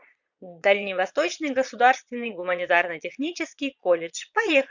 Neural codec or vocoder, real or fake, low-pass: vocoder, 22.05 kHz, 80 mel bands, Vocos; fake; 7.2 kHz